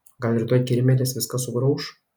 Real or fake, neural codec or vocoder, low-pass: real; none; 19.8 kHz